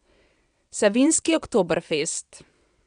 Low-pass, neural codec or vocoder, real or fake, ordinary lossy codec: 9.9 kHz; vocoder, 22.05 kHz, 80 mel bands, WaveNeXt; fake; none